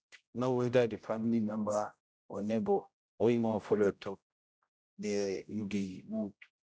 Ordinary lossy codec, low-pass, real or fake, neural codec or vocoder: none; none; fake; codec, 16 kHz, 0.5 kbps, X-Codec, HuBERT features, trained on general audio